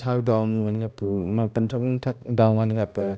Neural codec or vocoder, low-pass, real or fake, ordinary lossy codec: codec, 16 kHz, 1 kbps, X-Codec, HuBERT features, trained on balanced general audio; none; fake; none